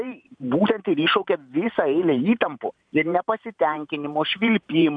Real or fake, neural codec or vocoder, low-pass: fake; vocoder, 44.1 kHz, 128 mel bands every 512 samples, BigVGAN v2; 9.9 kHz